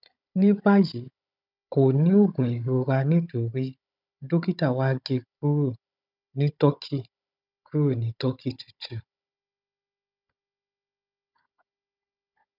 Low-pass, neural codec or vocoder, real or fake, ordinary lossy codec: 5.4 kHz; codec, 16 kHz, 16 kbps, FunCodec, trained on Chinese and English, 50 frames a second; fake; none